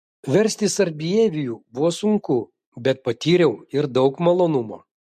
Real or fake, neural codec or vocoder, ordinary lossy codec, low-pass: real; none; MP3, 64 kbps; 14.4 kHz